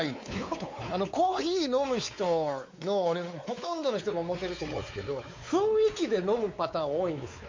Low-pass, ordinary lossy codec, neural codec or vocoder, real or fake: 7.2 kHz; MP3, 48 kbps; codec, 16 kHz, 4 kbps, X-Codec, WavLM features, trained on Multilingual LibriSpeech; fake